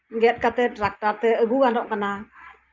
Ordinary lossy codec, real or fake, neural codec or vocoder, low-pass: Opus, 24 kbps; real; none; 7.2 kHz